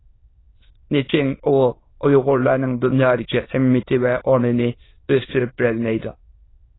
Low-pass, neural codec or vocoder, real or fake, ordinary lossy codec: 7.2 kHz; autoencoder, 22.05 kHz, a latent of 192 numbers a frame, VITS, trained on many speakers; fake; AAC, 16 kbps